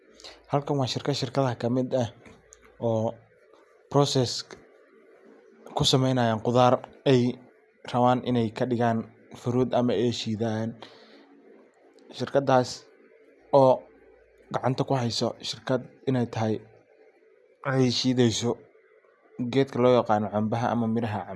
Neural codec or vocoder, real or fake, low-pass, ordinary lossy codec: none; real; none; none